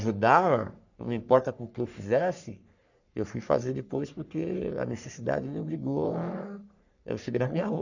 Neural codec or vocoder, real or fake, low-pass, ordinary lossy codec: codec, 44.1 kHz, 3.4 kbps, Pupu-Codec; fake; 7.2 kHz; none